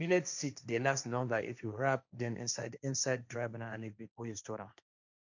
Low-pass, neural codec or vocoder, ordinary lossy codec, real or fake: 7.2 kHz; codec, 16 kHz, 1.1 kbps, Voila-Tokenizer; none; fake